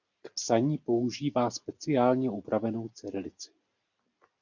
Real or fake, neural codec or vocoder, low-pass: real; none; 7.2 kHz